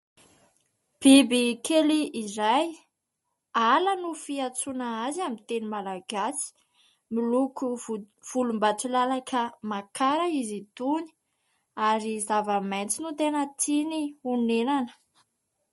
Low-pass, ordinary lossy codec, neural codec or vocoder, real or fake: 14.4 kHz; MP3, 48 kbps; none; real